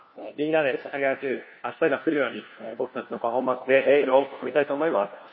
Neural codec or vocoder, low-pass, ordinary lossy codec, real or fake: codec, 16 kHz, 1 kbps, FunCodec, trained on LibriTTS, 50 frames a second; 7.2 kHz; MP3, 24 kbps; fake